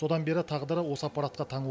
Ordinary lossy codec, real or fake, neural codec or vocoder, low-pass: none; real; none; none